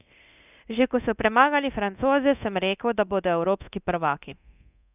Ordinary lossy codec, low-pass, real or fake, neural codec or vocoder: none; 3.6 kHz; fake; codec, 24 kHz, 0.9 kbps, DualCodec